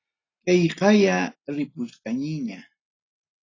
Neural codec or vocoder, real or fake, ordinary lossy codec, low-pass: none; real; AAC, 32 kbps; 7.2 kHz